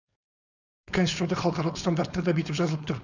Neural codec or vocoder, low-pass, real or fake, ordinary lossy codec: codec, 16 kHz, 4.8 kbps, FACodec; 7.2 kHz; fake; none